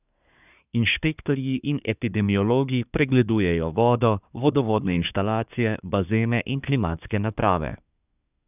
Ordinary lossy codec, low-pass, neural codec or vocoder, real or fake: none; 3.6 kHz; codec, 24 kHz, 1 kbps, SNAC; fake